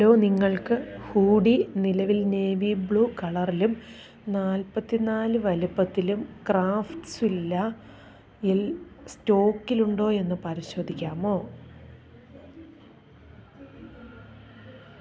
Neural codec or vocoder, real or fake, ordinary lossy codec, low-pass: none; real; none; none